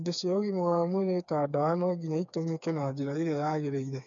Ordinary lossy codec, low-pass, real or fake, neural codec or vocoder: none; 7.2 kHz; fake; codec, 16 kHz, 4 kbps, FreqCodec, smaller model